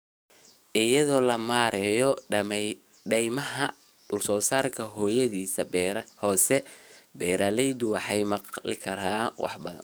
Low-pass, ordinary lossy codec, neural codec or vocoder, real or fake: none; none; codec, 44.1 kHz, 7.8 kbps, DAC; fake